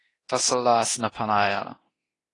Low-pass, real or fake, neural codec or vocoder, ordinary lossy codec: 10.8 kHz; fake; codec, 24 kHz, 0.9 kbps, DualCodec; AAC, 32 kbps